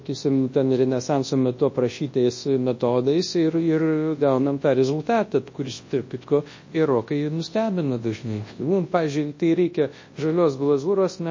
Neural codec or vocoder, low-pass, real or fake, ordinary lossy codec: codec, 24 kHz, 0.9 kbps, WavTokenizer, large speech release; 7.2 kHz; fake; MP3, 32 kbps